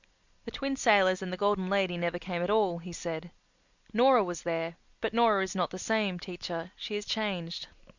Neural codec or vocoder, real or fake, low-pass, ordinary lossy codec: none; real; 7.2 kHz; Opus, 64 kbps